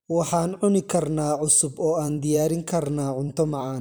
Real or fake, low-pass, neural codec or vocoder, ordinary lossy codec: fake; none; vocoder, 44.1 kHz, 128 mel bands every 256 samples, BigVGAN v2; none